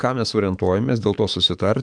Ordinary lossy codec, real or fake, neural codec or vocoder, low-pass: MP3, 96 kbps; fake; codec, 44.1 kHz, 7.8 kbps, DAC; 9.9 kHz